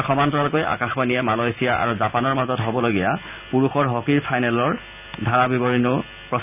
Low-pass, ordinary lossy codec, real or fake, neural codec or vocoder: 3.6 kHz; none; real; none